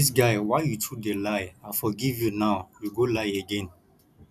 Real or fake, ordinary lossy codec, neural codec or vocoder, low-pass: real; none; none; 14.4 kHz